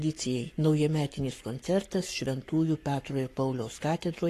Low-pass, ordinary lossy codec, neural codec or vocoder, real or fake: 14.4 kHz; AAC, 48 kbps; codec, 44.1 kHz, 7.8 kbps, Pupu-Codec; fake